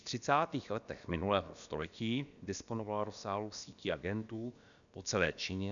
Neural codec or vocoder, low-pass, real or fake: codec, 16 kHz, about 1 kbps, DyCAST, with the encoder's durations; 7.2 kHz; fake